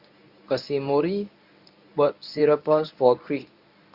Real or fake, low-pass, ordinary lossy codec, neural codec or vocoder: fake; 5.4 kHz; Opus, 64 kbps; codec, 24 kHz, 0.9 kbps, WavTokenizer, medium speech release version 1